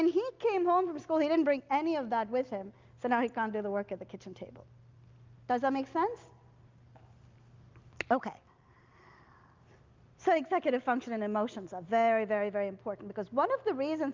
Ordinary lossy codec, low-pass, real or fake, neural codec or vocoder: Opus, 32 kbps; 7.2 kHz; real; none